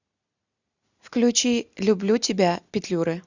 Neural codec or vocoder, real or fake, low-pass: none; real; 7.2 kHz